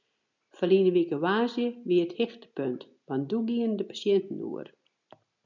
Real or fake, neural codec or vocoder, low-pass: real; none; 7.2 kHz